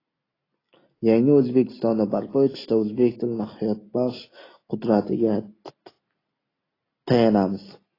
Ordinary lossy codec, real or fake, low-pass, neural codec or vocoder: AAC, 24 kbps; real; 5.4 kHz; none